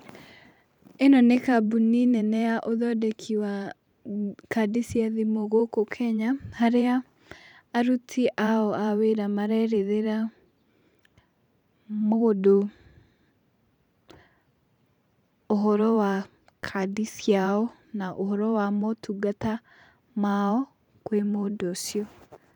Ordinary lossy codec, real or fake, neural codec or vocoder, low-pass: none; fake; vocoder, 44.1 kHz, 128 mel bands every 512 samples, BigVGAN v2; 19.8 kHz